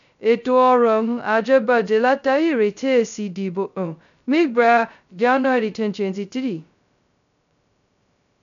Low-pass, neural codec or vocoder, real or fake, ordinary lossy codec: 7.2 kHz; codec, 16 kHz, 0.2 kbps, FocalCodec; fake; none